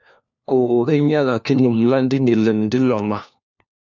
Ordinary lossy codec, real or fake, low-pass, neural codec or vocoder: MP3, 64 kbps; fake; 7.2 kHz; codec, 16 kHz, 1 kbps, FunCodec, trained on LibriTTS, 50 frames a second